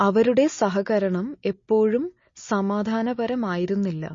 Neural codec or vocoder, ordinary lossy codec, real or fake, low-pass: none; MP3, 32 kbps; real; 7.2 kHz